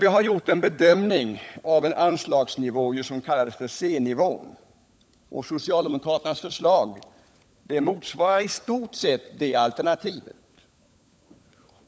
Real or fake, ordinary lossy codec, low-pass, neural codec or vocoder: fake; none; none; codec, 16 kHz, 16 kbps, FunCodec, trained on LibriTTS, 50 frames a second